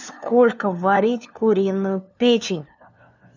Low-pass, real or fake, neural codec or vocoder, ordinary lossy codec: 7.2 kHz; fake; codec, 16 kHz, 2 kbps, FunCodec, trained on LibriTTS, 25 frames a second; none